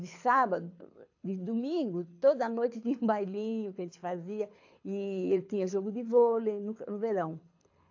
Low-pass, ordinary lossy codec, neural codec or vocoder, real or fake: 7.2 kHz; AAC, 48 kbps; codec, 24 kHz, 6 kbps, HILCodec; fake